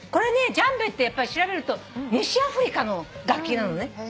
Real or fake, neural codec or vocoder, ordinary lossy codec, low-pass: real; none; none; none